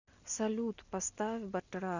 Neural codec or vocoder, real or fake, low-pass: none; real; 7.2 kHz